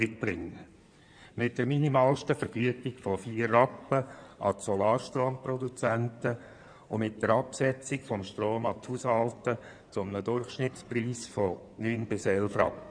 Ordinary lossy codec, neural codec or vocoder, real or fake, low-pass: none; codec, 16 kHz in and 24 kHz out, 2.2 kbps, FireRedTTS-2 codec; fake; 9.9 kHz